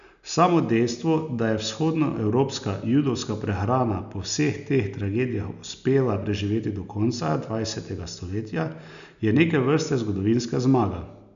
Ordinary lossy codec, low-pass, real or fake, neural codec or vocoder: none; 7.2 kHz; real; none